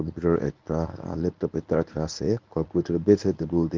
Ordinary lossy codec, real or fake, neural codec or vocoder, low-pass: Opus, 32 kbps; fake; codec, 24 kHz, 0.9 kbps, WavTokenizer, medium speech release version 1; 7.2 kHz